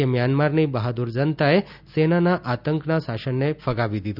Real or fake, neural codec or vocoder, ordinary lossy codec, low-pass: real; none; none; 5.4 kHz